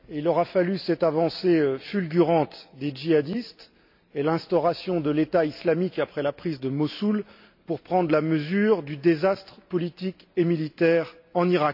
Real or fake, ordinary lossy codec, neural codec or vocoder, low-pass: real; AAC, 48 kbps; none; 5.4 kHz